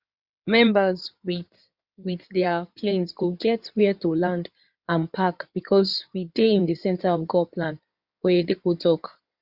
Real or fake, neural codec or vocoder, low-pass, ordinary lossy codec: fake; codec, 16 kHz in and 24 kHz out, 2.2 kbps, FireRedTTS-2 codec; 5.4 kHz; Opus, 64 kbps